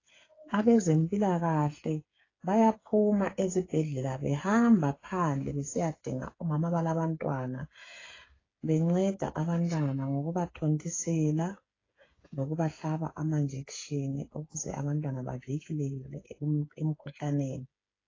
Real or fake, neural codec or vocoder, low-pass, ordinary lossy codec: fake; codec, 16 kHz, 8 kbps, FreqCodec, smaller model; 7.2 kHz; AAC, 32 kbps